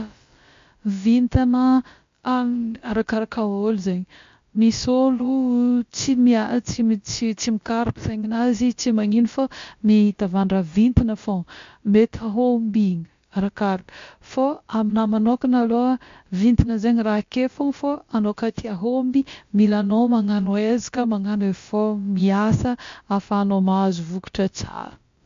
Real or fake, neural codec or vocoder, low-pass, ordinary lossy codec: fake; codec, 16 kHz, about 1 kbps, DyCAST, with the encoder's durations; 7.2 kHz; MP3, 48 kbps